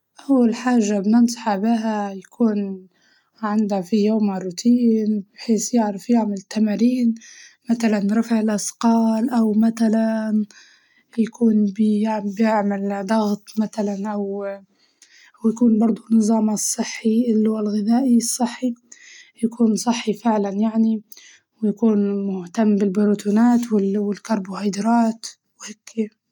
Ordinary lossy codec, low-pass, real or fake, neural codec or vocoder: none; 19.8 kHz; real; none